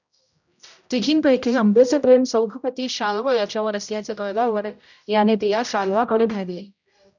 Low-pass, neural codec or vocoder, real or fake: 7.2 kHz; codec, 16 kHz, 0.5 kbps, X-Codec, HuBERT features, trained on general audio; fake